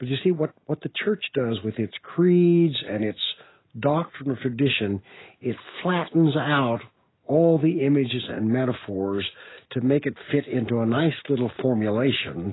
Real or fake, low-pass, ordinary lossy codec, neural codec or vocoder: real; 7.2 kHz; AAC, 16 kbps; none